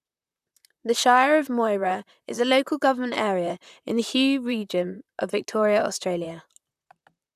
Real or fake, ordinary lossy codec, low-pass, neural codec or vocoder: fake; none; 14.4 kHz; vocoder, 44.1 kHz, 128 mel bands, Pupu-Vocoder